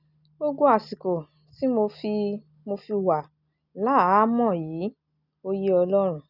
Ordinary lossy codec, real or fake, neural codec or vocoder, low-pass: none; real; none; 5.4 kHz